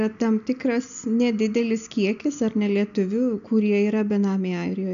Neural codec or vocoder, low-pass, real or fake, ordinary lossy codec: none; 7.2 kHz; real; MP3, 96 kbps